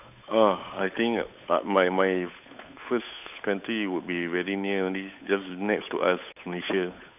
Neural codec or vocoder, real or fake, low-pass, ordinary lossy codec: codec, 16 kHz, 8 kbps, FunCodec, trained on Chinese and English, 25 frames a second; fake; 3.6 kHz; none